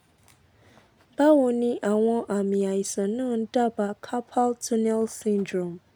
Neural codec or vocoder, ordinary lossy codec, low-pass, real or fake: none; none; none; real